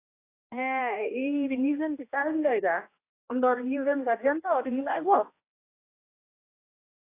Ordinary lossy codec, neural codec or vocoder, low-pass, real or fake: AAC, 24 kbps; codec, 16 kHz, 1 kbps, X-Codec, HuBERT features, trained on general audio; 3.6 kHz; fake